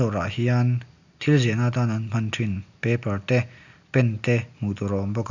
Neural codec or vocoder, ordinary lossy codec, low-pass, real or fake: none; none; 7.2 kHz; real